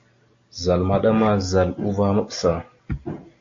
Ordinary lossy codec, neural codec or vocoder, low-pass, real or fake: AAC, 48 kbps; none; 7.2 kHz; real